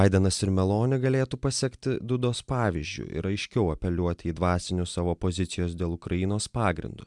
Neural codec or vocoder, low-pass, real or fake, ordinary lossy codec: none; 10.8 kHz; real; MP3, 96 kbps